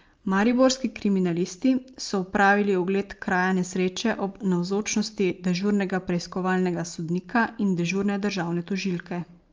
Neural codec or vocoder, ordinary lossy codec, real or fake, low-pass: none; Opus, 24 kbps; real; 7.2 kHz